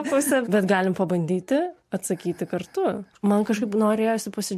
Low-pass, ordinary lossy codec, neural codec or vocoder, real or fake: 14.4 kHz; MP3, 64 kbps; none; real